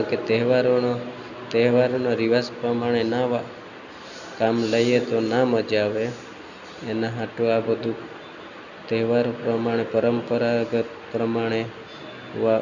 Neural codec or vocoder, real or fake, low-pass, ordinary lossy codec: none; real; 7.2 kHz; none